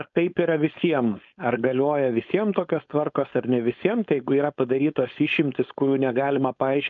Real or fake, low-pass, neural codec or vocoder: fake; 7.2 kHz; codec, 16 kHz, 4.8 kbps, FACodec